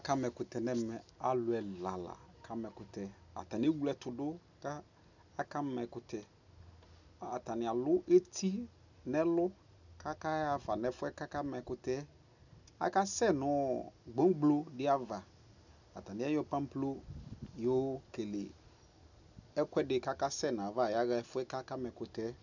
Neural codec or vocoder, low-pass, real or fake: none; 7.2 kHz; real